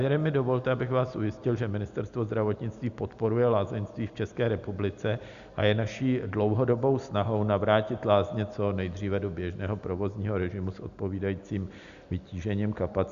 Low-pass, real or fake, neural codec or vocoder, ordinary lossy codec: 7.2 kHz; real; none; Opus, 64 kbps